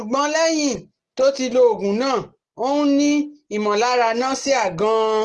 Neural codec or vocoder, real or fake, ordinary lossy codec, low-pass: none; real; Opus, 16 kbps; 10.8 kHz